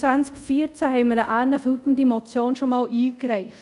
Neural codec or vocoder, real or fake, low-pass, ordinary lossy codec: codec, 24 kHz, 0.5 kbps, DualCodec; fake; 10.8 kHz; none